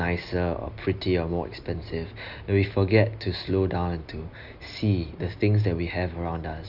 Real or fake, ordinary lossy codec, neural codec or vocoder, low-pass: real; Opus, 64 kbps; none; 5.4 kHz